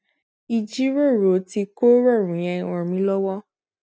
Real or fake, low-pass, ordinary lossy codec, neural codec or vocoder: real; none; none; none